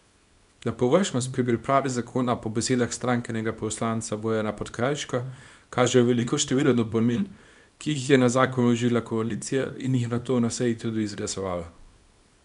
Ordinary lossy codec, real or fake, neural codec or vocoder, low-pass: none; fake; codec, 24 kHz, 0.9 kbps, WavTokenizer, small release; 10.8 kHz